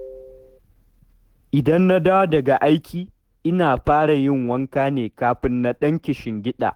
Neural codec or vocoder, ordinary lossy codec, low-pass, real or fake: autoencoder, 48 kHz, 128 numbers a frame, DAC-VAE, trained on Japanese speech; Opus, 16 kbps; 19.8 kHz; fake